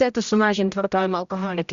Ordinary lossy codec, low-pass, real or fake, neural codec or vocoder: Opus, 64 kbps; 7.2 kHz; fake; codec, 16 kHz, 0.5 kbps, X-Codec, HuBERT features, trained on general audio